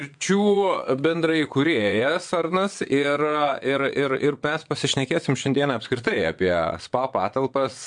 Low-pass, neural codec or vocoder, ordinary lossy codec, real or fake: 9.9 kHz; vocoder, 22.05 kHz, 80 mel bands, WaveNeXt; MP3, 64 kbps; fake